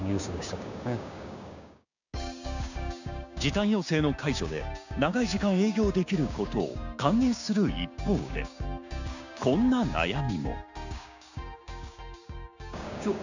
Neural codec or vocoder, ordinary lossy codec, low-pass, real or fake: codec, 16 kHz, 6 kbps, DAC; none; 7.2 kHz; fake